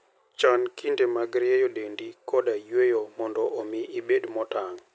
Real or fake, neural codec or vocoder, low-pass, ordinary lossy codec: real; none; none; none